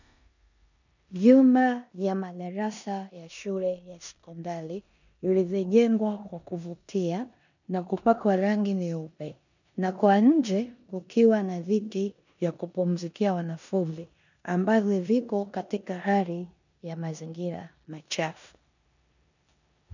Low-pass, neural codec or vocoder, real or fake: 7.2 kHz; codec, 16 kHz in and 24 kHz out, 0.9 kbps, LongCat-Audio-Codec, four codebook decoder; fake